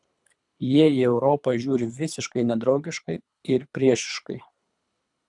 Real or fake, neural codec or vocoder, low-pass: fake; codec, 24 kHz, 3 kbps, HILCodec; 10.8 kHz